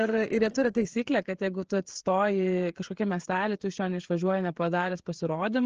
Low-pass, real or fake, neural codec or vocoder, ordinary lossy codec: 7.2 kHz; fake; codec, 16 kHz, 16 kbps, FreqCodec, smaller model; Opus, 16 kbps